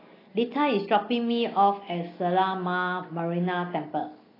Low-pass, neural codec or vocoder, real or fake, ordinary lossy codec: 5.4 kHz; none; real; AAC, 24 kbps